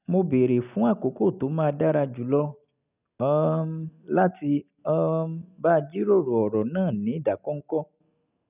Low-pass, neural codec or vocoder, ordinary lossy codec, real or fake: 3.6 kHz; none; none; real